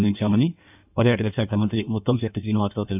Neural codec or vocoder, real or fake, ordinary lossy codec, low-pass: codec, 16 kHz in and 24 kHz out, 1.1 kbps, FireRedTTS-2 codec; fake; none; 3.6 kHz